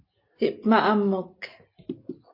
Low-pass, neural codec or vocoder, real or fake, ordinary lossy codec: 5.4 kHz; none; real; MP3, 32 kbps